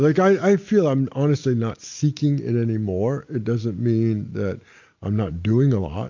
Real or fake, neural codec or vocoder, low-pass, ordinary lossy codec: real; none; 7.2 kHz; MP3, 48 kbps